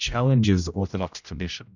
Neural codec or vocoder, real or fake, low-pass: codec, 16 kHz, 0.5 kbps, X-Codec, HuBERT features, trained on general audio; fake; 7.2 kHz